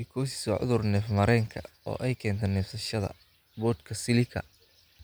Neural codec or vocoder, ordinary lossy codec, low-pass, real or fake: none; none; none; real